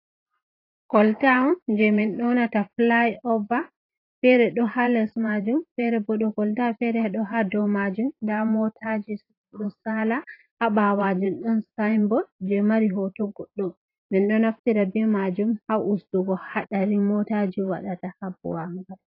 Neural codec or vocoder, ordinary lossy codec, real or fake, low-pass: vocoder, 44.1 kHz, 128 mel bands every 512 samples, BigVGAN v2; AAC, 32 kbps; fake; 5.4 kHz